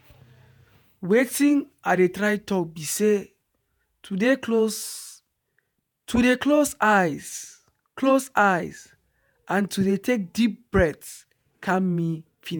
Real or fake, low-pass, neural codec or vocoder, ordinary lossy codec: fake; none; vocoder, 48 kHz, 128 mel bands, Vocos; none